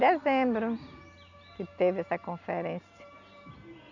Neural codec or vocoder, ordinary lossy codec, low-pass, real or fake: none; none; 7.2 kHz; real